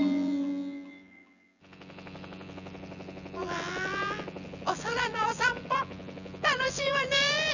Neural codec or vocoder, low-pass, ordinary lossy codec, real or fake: vocoder, 24 kHz, 100 mel bands, Vocos; 7.2 kHz; none; fake